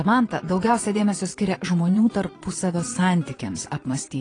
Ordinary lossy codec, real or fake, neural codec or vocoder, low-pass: AAC, 32 kbps; fake; vocoder, 22.05 kHz, 80 mel bands, Vocos; 9.9 kHz